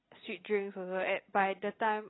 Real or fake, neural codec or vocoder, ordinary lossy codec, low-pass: real; none; AAC, 16 kbps; 7.2 kHz